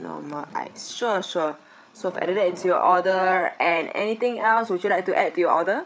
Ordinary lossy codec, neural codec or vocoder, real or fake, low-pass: none; codec, 16 kHz, 8 kbps, FreqCodec, larger model; fake; none